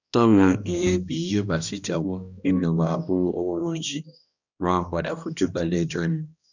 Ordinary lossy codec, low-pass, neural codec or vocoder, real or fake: none; 7.2 kHz; codec, 16 kHz, 1 kbps, X-Codec, HuBERT features, trained on balanced general audio; fake